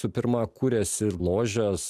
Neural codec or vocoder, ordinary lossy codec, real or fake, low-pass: none; MP3, 96 kbps; real; 14.4 kHz